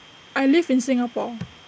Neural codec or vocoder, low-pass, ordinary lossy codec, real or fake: none; none; none; real